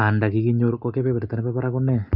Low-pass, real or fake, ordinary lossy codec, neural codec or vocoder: 5.4 kHz; real; none; none